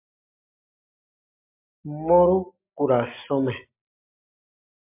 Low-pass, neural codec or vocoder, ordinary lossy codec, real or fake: 3.6 kHz; none; MP3, 32 kbps; real